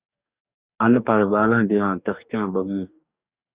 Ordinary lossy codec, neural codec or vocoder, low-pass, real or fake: Opus, 64 kbps; codec, 44.1 kHz, 2.6 kbps, DAC; 3.6 kHz; fake